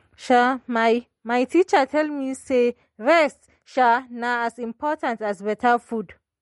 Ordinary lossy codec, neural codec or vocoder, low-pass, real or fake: MP3, 48 kbps; none; 19.8 kHz; real